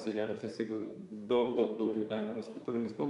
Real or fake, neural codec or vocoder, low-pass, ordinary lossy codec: fake; codec, 24 kHz, 1 kbps, SNAC; 10.8 kHz; MP3, 96 kbps